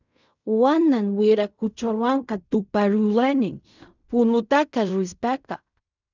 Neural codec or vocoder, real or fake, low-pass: codec, 16 kHz in and 24 kHz out, 0.4 kbps, LongCat-Audio-Codec, fine tuned four codebook decoder; fake; 7.2 kHz